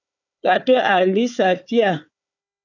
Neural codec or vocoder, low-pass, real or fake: codec, 16 kHz, 4 kbps, FunCodec, trained on Chinese and English, 50 frames a second; 7.2 kHz; fake